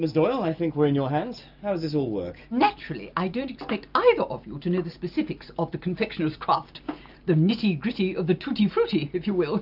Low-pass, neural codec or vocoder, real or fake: 5.4 kHz; none; real